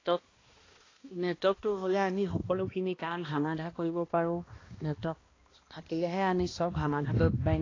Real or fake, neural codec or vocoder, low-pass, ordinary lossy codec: fake; codec, 16 kHz, 1 kbps, X-Codec, HuBERT features, trained on balanced general audio; 7.2 kHz; AAC, 32 kbps